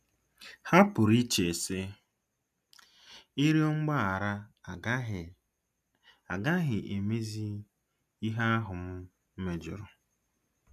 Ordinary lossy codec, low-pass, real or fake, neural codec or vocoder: none; 14.4 kHz; real; none